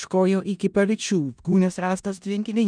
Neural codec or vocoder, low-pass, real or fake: codec, 16 kHz in and 24 kHz out, 0.4 kbps, LongCat-Audio-Codec, four codebook decoder; 9.9 kHz; fake